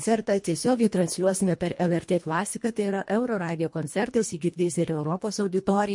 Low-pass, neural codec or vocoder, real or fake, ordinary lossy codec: 10.8 kHz; codec, 24 kHz, 1.5 kbps, HILCodec; fake; MP3, 48 kbps